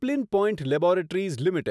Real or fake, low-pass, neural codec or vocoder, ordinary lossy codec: real; none; none; none